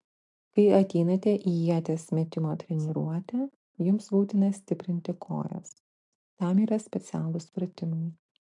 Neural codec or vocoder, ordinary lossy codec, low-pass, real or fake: none; MP3, 64 kbps; 10.8 kHz; real